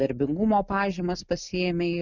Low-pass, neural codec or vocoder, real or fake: 7.2 kHz; none; real